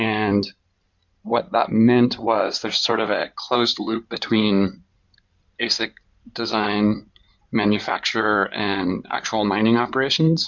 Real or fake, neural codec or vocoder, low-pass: fake; codec, 16 kHz in and 24 kHz out, 2.2 kbps, FireRedTTS-2 codec; 7.2 kHz